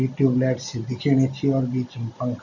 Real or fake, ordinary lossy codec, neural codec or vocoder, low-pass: real; none; none; 7.2 kHz